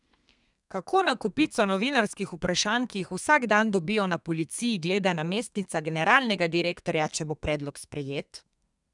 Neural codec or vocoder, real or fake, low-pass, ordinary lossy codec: codec, 32 kHz, 1.9 kbps, SNAC; fake; 10.8 kHz; none